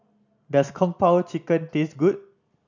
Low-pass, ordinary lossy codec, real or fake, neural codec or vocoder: 7.2 kHz; none; real; none